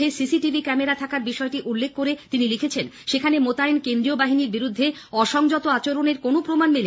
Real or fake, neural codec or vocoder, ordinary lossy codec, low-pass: real; none; none; none